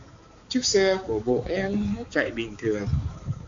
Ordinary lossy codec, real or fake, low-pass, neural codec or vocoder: AAC, 48 kbps; fake; 7.2 kHz; codec, 16 kHz, 4 kbps, X-Codec, HuBERT features, trained on balanced general audio